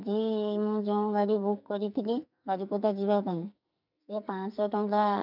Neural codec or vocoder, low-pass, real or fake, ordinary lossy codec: codec, 44.1 kHz, 2.6 kbps, SNAC; 5.4 kHz; fake; none